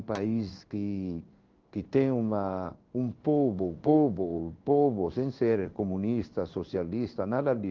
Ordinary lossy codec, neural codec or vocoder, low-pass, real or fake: Opus, 24 kbps; codec, 16 kHz in and 24 kHz out, 1 kbps, XY-Tokenizer; 7.2 kHz; fake